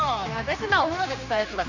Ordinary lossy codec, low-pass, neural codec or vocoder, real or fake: none; 7.2 kHz; codec, 16 kHz, 2 kbps, X-Codec, HuBERT features, trained on general audio; fake